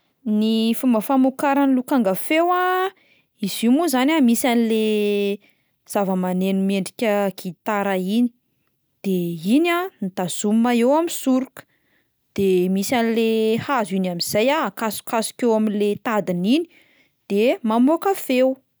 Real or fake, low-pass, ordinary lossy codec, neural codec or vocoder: real; none; none; none